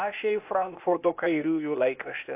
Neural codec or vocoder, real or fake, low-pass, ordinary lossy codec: codec, 16 kHz, 0.8 kbps, ZipCodec; fake; 3.6 kHz; AAC, 32 kbps